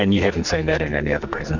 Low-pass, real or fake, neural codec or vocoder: 7.2 kHz; fake; codec, 44.1 kHz, 2.6 kbps, SNAC